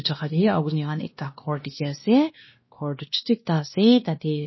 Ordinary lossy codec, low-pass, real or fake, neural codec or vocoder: MP3, 24 kbps; 7.2 kHz; fake; codec, 16 kHz, 1 kbps, X-Codec, WavLM features, trained on Multilingual LibriSpeech